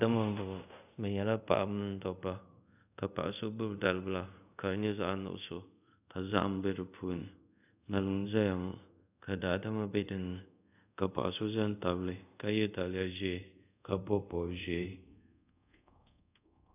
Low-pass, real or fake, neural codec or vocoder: 3.6 kHz; fake; codec, 24 kHz, 0.5 kbps, DualCodec